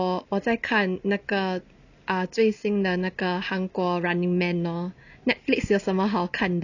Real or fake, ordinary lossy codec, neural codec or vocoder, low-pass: real; none; none; 7.2 kHz